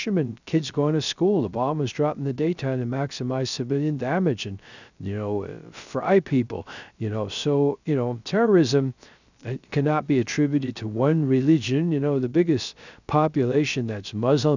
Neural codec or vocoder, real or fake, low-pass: codec, 16 kHz, 0.3 kbps, FocalCodec; fake; 7.2 kHz